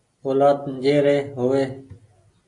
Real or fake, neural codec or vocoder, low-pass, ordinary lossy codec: real; none; 10.8 kHz; AAC, 48 kbps